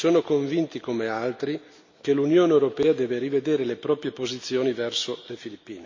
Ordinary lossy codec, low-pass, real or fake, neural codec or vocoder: MP3, 48 kbps; 7.2 kHz; real; none